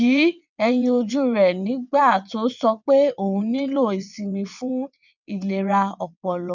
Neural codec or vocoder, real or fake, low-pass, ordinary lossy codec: vocoder, 22.05 kHz, 80 mel bands, WaveNeXt; fake; 7.2 kHz; none